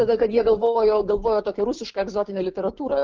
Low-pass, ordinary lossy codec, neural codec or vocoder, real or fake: 7.2 kHz; Opus, 16 kbps; codec, 16 kHz, 6 kbps, DAC; fake